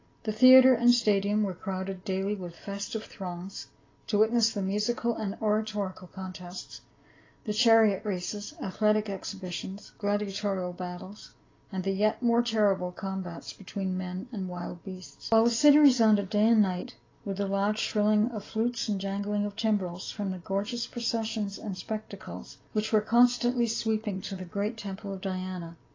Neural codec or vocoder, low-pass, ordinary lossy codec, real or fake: none; 7.2 kHz; AAC, 32 kbps; real